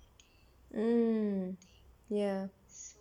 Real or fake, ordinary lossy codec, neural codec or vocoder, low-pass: real; MP3, 96 kbps; none; 19.8 kHz